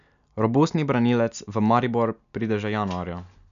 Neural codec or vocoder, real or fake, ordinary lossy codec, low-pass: none; real; none; 7.2 kHz